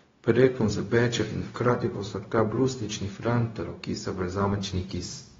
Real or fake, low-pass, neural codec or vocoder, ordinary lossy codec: fake; 7.2 kHz; codec, 16 kHz, 0.4 kbps, LongCat-Audio-Codec; AAC, 24 kbps